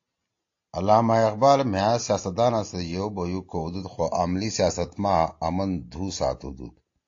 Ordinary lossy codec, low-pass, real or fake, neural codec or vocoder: AAC, 48 kbps; 7.2 kHz; real; none